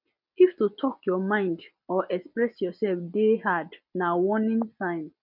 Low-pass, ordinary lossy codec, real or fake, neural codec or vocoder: 5.4 kHz; none; real; none